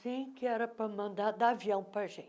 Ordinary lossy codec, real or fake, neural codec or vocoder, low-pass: none; real; none; none